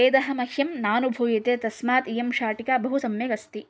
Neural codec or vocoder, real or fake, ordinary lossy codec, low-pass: none; real; none; none